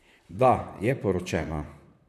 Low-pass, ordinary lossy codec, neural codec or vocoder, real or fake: 14.4 kHz; none; vocoder, 44.1 kHz, 128 mel bands, Pupu-Vocoder; fake